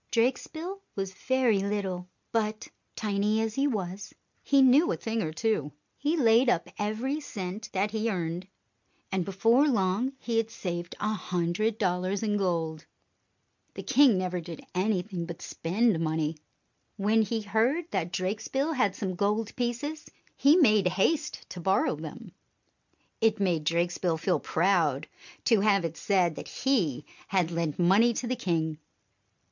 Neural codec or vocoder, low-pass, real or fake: none; 7.2 kHz; real